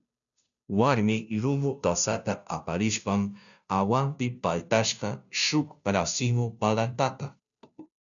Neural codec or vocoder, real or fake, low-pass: codec, 16 kHz, 0.5 kbps, FunCodec, trained on Chinese and English, 25 frames a second; fake; 7.2 kHz